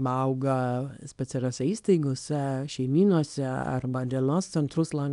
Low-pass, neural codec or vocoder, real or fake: 10.8 kHz; codec, 24 kHz, 0.9 kbps, WavTokenizer, small release; fake